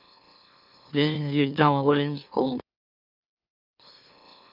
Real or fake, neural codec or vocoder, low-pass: fake; autoencoder, 44.1 kHz, a latent of 192 numbers a frame, MeloTTS; 5.4 kHz